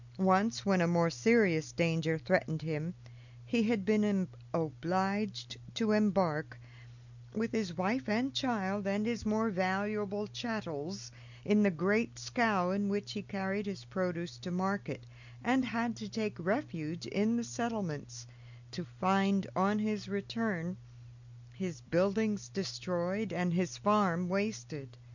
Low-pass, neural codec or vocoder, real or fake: 7.2 kHz; none; real